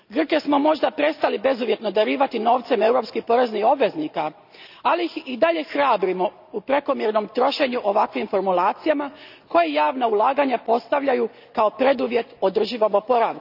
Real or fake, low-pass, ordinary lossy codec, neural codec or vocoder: real; 5.4 kHz; none; none